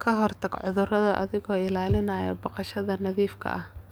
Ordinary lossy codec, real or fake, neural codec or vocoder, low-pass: none; fake; codec, 44.1 kHz, 7.8 kbps, DAC; none